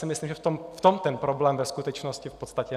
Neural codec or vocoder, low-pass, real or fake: none; 14.4 kHz; real